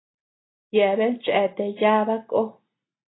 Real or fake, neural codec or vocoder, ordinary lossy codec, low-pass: real; none; AAC, 16 kbps; 7.2 kHz